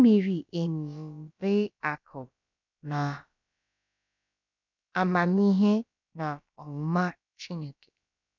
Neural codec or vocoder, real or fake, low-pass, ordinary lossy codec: codec, 16 kHz, about 1 kbps, DyCAST, with the encoder's durations; fake; 7.2 kHz; none